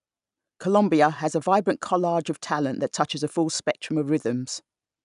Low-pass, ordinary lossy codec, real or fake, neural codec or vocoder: 10.8 kHz; none; real; none